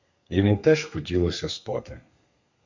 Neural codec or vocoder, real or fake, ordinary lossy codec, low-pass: codec, 32 kHz, 1.9 kbps, SNAC; fake; MP3, 64 kbps; 7.2 kHz